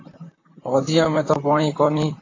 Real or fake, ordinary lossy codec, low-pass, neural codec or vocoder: fake; AAC, 32 kbps; 7.2 kHz; vocoder, 44.1 kHz, 128 mel bands, Pupu-Vocoder